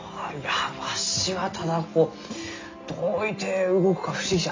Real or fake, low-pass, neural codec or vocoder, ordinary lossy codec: real; 7.2 kHz; none; AAC, 32 kbps